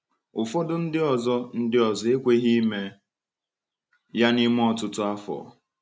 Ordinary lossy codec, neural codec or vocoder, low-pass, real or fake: none; none; none; real